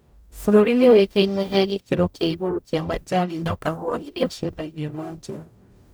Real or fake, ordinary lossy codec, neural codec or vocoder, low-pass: fake; none; codec, 44.1 kHz, 0.9 kbps, DAC; none